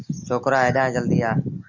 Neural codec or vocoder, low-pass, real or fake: none; 7.2 kHz; real